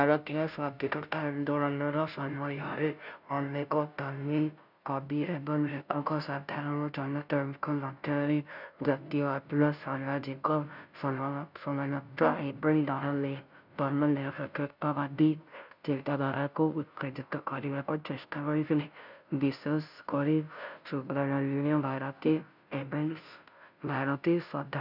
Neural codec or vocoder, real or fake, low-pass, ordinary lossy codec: codec, 16 kHz, 0.5 kbps, FunCodec, trained on Chinese and English, 25 frames a second; fake; 5.4 kHz; none